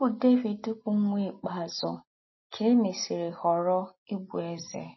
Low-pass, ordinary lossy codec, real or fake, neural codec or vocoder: 7.2 kHz; MP3, 24 kbps; real; none